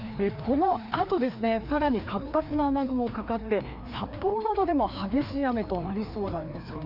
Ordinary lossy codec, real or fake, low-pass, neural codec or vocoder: none; fake; 5.4 kHz; codec, 16 kHz, 2 kbps, FreqCodec, larger model